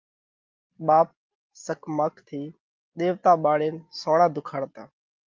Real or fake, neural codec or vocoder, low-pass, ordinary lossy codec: real; none; 7.2 kHz; Opus, 32 kbps